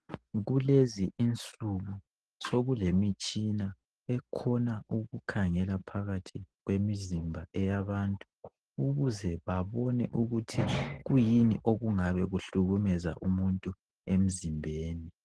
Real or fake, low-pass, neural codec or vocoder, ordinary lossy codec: real; 10.8 kHz; none; Opus, 16 kbps